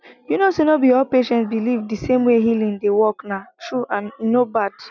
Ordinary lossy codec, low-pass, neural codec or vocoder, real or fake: none; 7.2 kHz; none; real